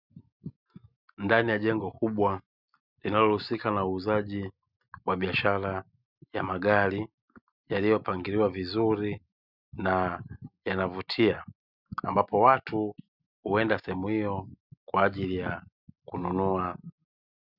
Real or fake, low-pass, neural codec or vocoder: real; 5.4 kHz; none